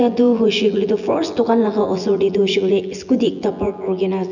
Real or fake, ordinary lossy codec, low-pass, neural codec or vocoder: fake; none; 7.2 kHz; vocoder, 24 kHz, 100 mel bands, Vocos